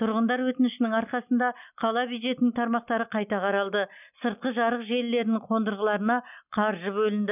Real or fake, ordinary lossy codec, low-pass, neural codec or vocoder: real; none; 3.6 kHz; none